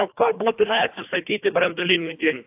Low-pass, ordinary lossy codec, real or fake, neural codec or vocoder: 3.6 kHz; AAC, 32 kbps; fake; codec, 24 kHz, 1.5 kbps, HILCodec